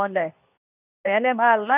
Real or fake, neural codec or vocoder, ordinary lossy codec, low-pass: fake; codec, 24 kHz, 0.9 kbps, WavTokenizer, medium speech release version 2; none; 3.6 kHz